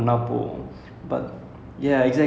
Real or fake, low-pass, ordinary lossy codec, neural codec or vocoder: real; none; none; none